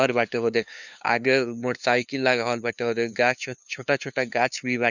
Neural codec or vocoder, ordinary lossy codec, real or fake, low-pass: codec, 16 kHz, 2 kbps, FunCodec, trained on LibriTTS, 25 frames a second; none; fake; 7.2 kHz